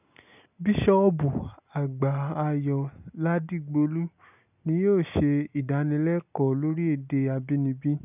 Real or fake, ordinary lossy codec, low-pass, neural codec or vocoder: real; none; 3.6 kHz; none